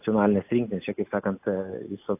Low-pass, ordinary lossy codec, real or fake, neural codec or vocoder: 3.6 kHz; AAC, 32 kbps; real; none